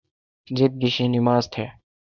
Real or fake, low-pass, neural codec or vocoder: fake; 7.2 kHz; codec, 24 kHz, 0.9 kbps, WavTokenizer, small release